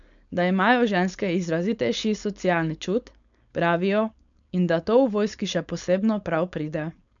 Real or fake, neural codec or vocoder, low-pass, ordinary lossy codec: fake; codec, 16 kHz, 4.8 kbps, FACodec; 7.2 kHz; none